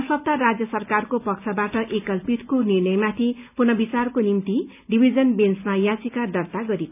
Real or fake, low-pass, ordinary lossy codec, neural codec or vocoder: real; 3.6 kHz; none; none